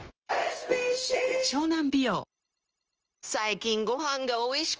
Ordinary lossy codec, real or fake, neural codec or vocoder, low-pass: Opus, 24 kbps; fake; codec, 16 kHz, 0.9 kbps, LongCat-Audio-Codec; 7.2 kHz